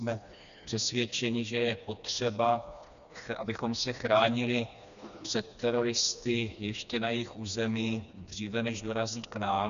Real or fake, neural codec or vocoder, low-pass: fake; codec, 16 kHz, 2 kbps, FreqCodec, smaller model; 7.2 kHz